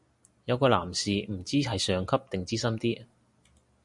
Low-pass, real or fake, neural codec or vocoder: 10.8 kHz; real; none